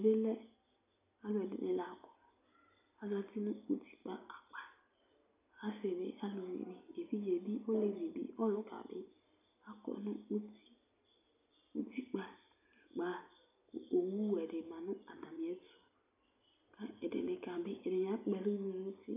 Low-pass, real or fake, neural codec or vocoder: 3.6 kHz; real; none